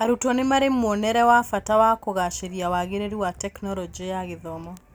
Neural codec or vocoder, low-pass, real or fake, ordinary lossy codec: none; none; real; none